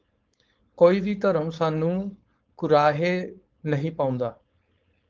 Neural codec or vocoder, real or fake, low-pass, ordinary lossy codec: codec, 16 kHz, 4.8 kbps, FACodec; fake; 7.2 kHz; Opus, 32 kbps